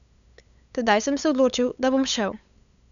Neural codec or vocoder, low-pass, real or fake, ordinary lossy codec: codec, 16 kHz, 8 kbps, FunCodec, trained on LibriTTS, 25 frames a second; 7.2 kHz; fake; none